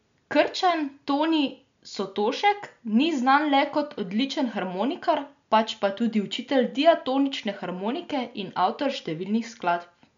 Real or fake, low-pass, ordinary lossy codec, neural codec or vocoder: real; 7.2 kHz; MP3, 64 kbps; none